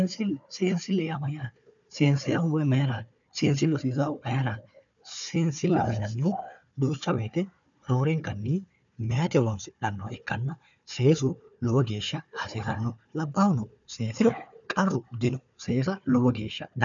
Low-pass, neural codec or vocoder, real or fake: 7.2 kHz; codec, 16 kHz, 4 kbps, FunCodec, trained on Chinese and English, 50 frames a second; fake